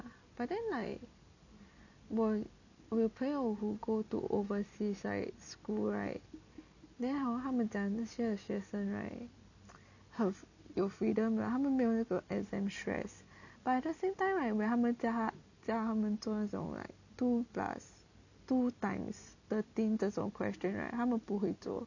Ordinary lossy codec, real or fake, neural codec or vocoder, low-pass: none; real; none; 7.2 kHz